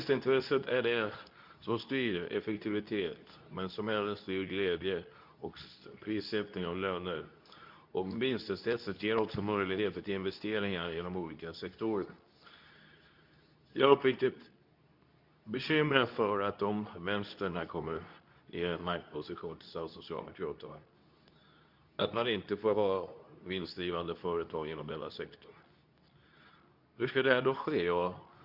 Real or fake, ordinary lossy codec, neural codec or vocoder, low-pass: fake; none; codec, 24 kHz, 0.9 kbps, WavTokenizer, medium speech release version 2; 5.4 kHz